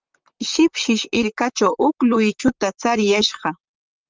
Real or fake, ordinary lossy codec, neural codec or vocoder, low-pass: fake; Opus, 24 kbps; vocoder, 44.1 kHz, 128 mel bands, Pupu-Vocoder; 7.2 kHz